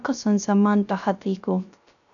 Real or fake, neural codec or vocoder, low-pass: fake; codec, 16 kHz, 0.3 kbps, FocalCodec; 7.2 kHz